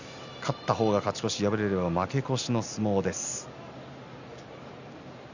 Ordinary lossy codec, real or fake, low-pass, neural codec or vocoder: none; real; 7.2 kHz; none